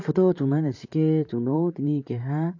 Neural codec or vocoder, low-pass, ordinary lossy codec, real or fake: vocoder, 44.1 kHz, 128 mel bands, Pupu-Vocoder; 7.2 kHz; AAC, 48 kbps; fake